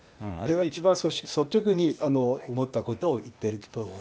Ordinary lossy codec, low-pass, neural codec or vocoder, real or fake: none; none; codec, 16 kHz, 0.8 kbps, ZipCodec; fake